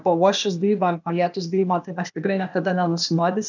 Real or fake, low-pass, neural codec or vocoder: fake; 7.2 kHz; codec, 16 kHz, 0.8 kbps, ZipCodec